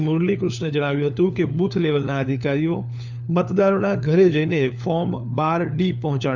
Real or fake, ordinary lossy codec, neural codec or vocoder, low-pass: fake; none; codec, 16 kHz, 4 kbps, FunCodec, trained on LibriTTS, 50 frames a second; 7.2 kHz